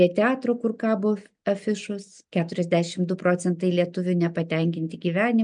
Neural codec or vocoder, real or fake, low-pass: none; real; 9.9 kHz